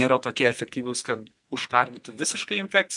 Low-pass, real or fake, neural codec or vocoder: 10.8 kHz; fake; codec, 44.1 kHz, 2.6 kbps, SNAC